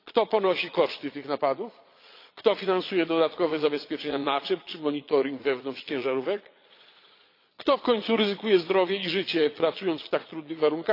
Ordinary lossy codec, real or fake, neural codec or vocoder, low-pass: AAC, 32 kbps; fake; vocoder, 22.05 kHz, 80 mel bands, Vocos; 5.4 kHz